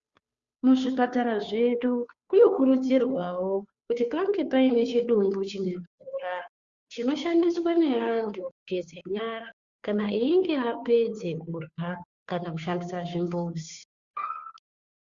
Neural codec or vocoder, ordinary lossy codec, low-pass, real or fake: codec, 16 kHz, 2 kbps, FunCodec, trained on Chinese and English, 25 frames a second; Opus, 64 kbps; 7.2 kHz; fake